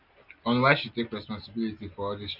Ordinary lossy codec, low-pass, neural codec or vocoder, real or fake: none; 5.4 kHz; none; real